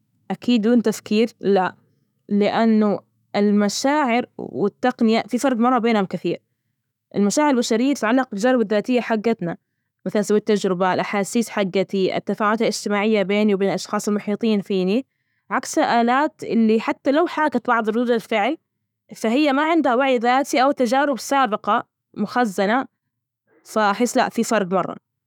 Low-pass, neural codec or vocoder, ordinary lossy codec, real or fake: 19.8 kHz; autoencoder, 48 kHz, 128 numbers a frame, DAC-VAE, trained on Japanese speech; none; fake